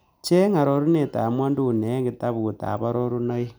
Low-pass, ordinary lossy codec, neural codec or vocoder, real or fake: none; none; none; real